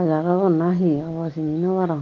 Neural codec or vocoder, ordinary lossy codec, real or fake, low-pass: none; Opus, 32 kbps; real; 7.2 kHz